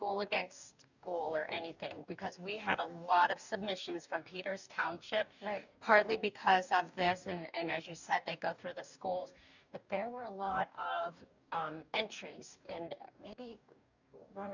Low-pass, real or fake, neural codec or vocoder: 7.2 kHz; fake; codec, 44.1 kHz, 2.6 kbps, DAC